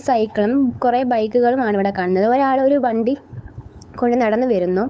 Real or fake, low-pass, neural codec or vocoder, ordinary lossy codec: fake; none; codec, 16 kHz, 8 kbps, FunCodec, trained on LibriTTS, 25 frames a second; none